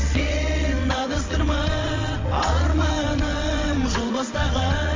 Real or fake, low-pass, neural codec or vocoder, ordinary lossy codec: fake; 7.2 kHz; vocoder, 22.05 kHz, 80 mel bands, WaveNeXt; MP3, 48 kbps